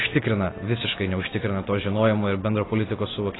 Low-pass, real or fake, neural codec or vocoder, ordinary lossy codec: 7.2 kHz; real; none; AAC, 16 kbps